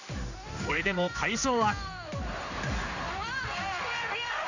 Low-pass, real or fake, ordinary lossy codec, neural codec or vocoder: 7.2 kHz; fake; none; codec, 16 kHz in and 24 kHz out, 1 kbps, XY-Tokenizer